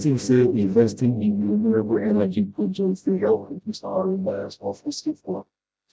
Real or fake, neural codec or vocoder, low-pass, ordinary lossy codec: fake; codec, 16 kHz, 0.5 kbps, FreqCodec, smaller model; none; none